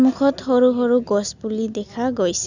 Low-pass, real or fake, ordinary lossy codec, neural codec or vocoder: 7.2 kHz; real; none; none